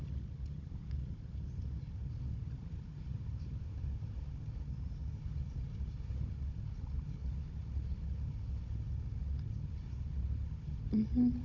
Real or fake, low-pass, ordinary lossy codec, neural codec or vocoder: fake; 7.2 kHz; MP3, 48 kbps; codec, 16 kHz, 16 kbps, FunCodec, trained on Chinese and English, 50 frames a second